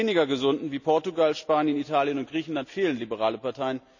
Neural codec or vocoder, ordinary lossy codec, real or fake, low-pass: none; none; real; 7.2 kHz